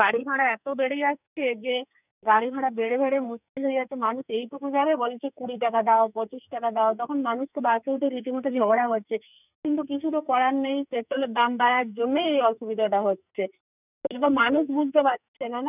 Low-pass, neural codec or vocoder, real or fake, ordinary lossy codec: 3.6 kHz; codec, 44.1 kHz, 2.6 kbps, SNAC; fake; none